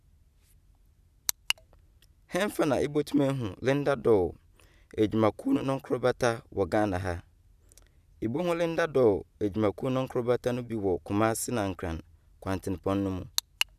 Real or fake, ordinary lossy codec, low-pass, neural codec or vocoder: real; none; 14.4 kHz; none